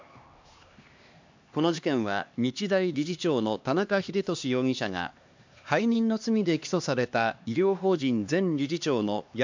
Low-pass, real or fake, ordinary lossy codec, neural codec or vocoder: 7.2 kHz; fake; MP3, 64 kbps; codec, 16 kHz, 2 kbps, X-Codec, HuBERT features, trained on LibriSpeech